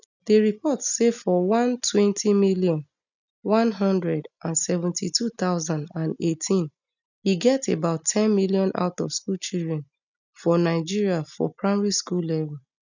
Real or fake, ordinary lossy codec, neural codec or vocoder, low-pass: real; none; none; 7.2 kHz